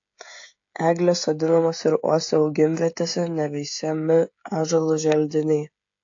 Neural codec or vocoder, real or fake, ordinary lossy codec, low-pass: codec, 16 kHz, 16 kbps, FreqCodec, smaller model; fake; AAC, 48 kbps; 7.2 kHz